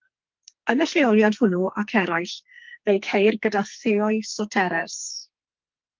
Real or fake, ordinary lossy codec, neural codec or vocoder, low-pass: fake; Opus, 32 kbps; codec, 44.1 kHz, 2.6 kbps, SNAC; 7.2 kHz